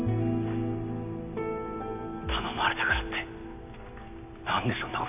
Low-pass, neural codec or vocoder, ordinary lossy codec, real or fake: 3.6 kHz; none; AAC, 32 kbps; real